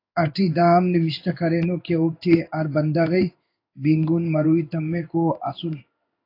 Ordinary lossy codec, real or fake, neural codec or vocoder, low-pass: AAC, 32 kbps; fake; codec, 16 kHz in and 24 kHz out, 1 kbps, XY-Tokenizer; 5.4 kHz